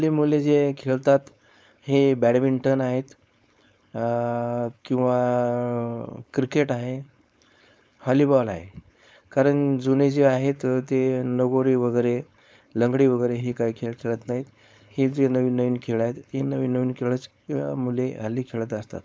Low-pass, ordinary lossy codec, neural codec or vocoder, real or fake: none; none; codec, 16 kHz, 4.8 kbps, FACodec; fake